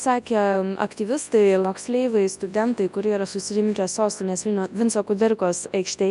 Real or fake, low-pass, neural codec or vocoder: fake; 10.8 kHz; codec, 24 kHz, 0.9 kbps, WavTokenizer, large speech release